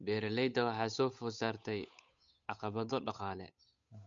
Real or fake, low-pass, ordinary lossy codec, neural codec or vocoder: fake; 7.2 kHz; MP3, 64 kbps; codec, 16 kHz, 8 kbps, FunCodec, trained on Chinese and English, 25 frames a second